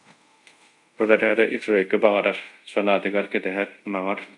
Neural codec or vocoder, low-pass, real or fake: codec, 24 kHz, 0.5 kbps, DualCodec; 10.8 kHz; fake